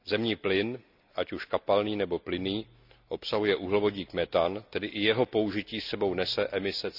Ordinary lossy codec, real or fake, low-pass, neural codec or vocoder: none; real; 5.4 kHz; none